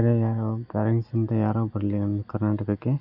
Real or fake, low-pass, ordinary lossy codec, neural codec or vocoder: real; 5.4 kHz; none; none